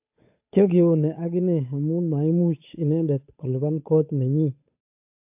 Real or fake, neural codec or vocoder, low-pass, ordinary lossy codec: fake; codec, 16 kHz, 8 kbps, FunCodec, trained on Chinese and English, 25 frames a second; 3.6 kHz; AAC, 32 kbps